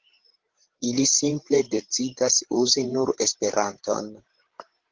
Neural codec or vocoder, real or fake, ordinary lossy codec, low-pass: codec, 16 kHz, 16 kbps, FreqCodec, larger model; fake; Opus, 16 kbps; 7.2 kHz